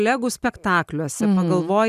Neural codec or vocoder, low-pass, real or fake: none; 14.4 kHz; real